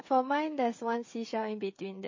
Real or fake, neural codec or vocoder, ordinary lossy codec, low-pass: fake; codec, 16 kHz, 16 kbps, FreqCodec, smaller model; MP3, 32 kbps; 7.2 kHz